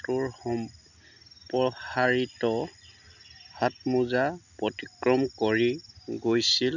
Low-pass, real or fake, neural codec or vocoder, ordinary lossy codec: 7.2 kHz; real; none; none